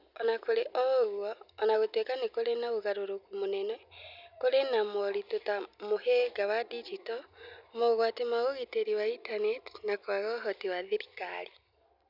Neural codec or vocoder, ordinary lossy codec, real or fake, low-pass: none; none; real; 5.4 kHz